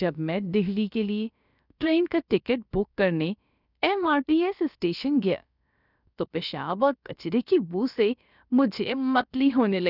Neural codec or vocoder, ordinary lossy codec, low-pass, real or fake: codec, 16 kHz, about 1 kbps, DyCAST, with the encoder's durations; none; 5.4 kHz; fake